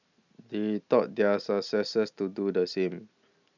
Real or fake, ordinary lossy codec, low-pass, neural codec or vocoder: real; none; 7.2 kHz; none